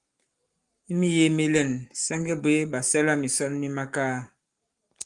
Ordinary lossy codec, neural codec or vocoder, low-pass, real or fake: Opus, 32 kbps; codec, 44.1 kHz, 7.8 kbps, Pupu-Codec; 10.8 kHz; fake